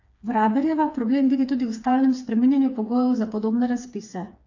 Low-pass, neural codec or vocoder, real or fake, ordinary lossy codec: 7.2 kHz; codec, 16 kHz, 4 kbps, FreqCodec, smaller model; fake; AAC, 48 kbps